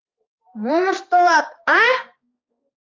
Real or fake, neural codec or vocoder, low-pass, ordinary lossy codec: fake; codec, 16 kHz, 2 kbps, X-Codec, HuBERT features, trained on balanced general audio; 7.2 kHz; Opus, 32 kbps